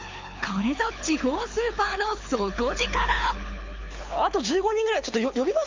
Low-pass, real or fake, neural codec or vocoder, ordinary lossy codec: 7.2 kHz; fake; codec, 24 kHz, 6 kbps, HILCodec; MP3, 64 kbps